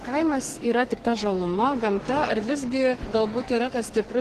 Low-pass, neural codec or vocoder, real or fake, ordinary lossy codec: 14.4 kHz; codec, 44.1 kHz, 2.6 kbps, SNAC; fake; Opus, 16 kbps